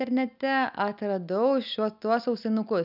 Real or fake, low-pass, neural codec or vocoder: real; 5.4 kHz; none